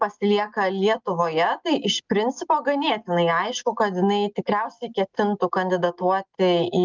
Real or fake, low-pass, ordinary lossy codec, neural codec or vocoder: real; 7.2 kHz; Opus, 24 kbps; none